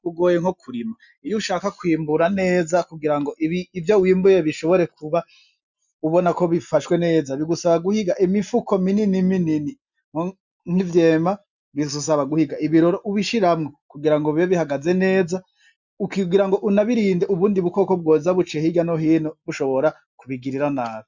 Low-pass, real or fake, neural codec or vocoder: 7.2 kHz; real; none